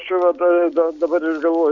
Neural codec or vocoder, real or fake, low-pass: none; real; 7.2 kHz